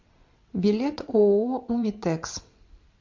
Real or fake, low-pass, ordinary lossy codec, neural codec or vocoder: fake; 7.2 kHz; MP3, 48 kbps; vocoder, 22.05 kHz, 80 mel bands, WaveNeXt